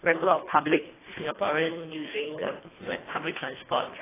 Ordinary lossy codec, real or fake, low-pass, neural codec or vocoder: AAC, 16 kbps; fake; 3.6 kHz; codec, 24 kHz, 1.5 kbps, HILCodec